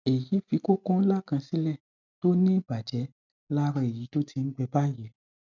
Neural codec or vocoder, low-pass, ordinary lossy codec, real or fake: none; 7.2 kHz; none; real